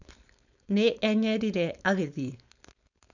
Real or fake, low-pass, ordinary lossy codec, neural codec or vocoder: fake; 7.2 kHz; none; codec, 16 kHz, 4.8 kbps, FACodec